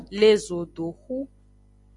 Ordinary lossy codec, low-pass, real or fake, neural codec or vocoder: AAC, 64 kbps; 10.8 kHz; real; none